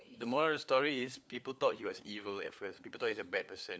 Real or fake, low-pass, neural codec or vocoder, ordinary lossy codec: fake; none; codec, 16 kHz, 4 kbps, FunCodec, trained on LibriTTS, 50 frames a second; none